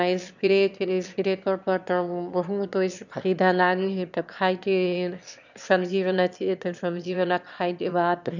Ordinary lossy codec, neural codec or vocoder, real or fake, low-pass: none; autoencoder, 22.05 kHz, a latent of 192 numbers a frame, VITS, trained on one speaker; fake; 7.2 kHz